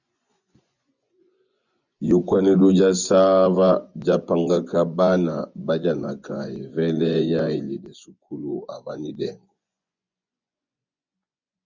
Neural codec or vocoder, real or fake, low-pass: vocoder, 24 kHz, 100 mel bands, Vocos; fake; 7.2 kHz